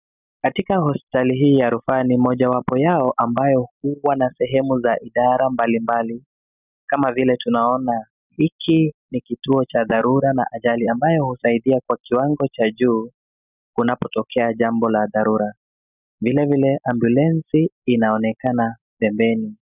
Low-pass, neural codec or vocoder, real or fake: 3.6 kHz; none; real